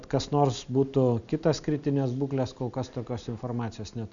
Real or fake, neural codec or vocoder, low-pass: real; none; 7.2 kHz